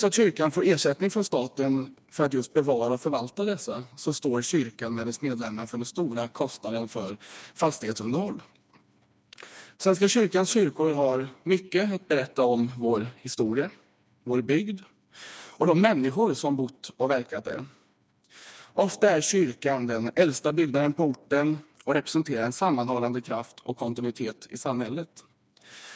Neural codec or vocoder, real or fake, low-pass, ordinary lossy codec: codec, 16 kHz, 2 kbps, FreqCodec, smaller model; fake; none; none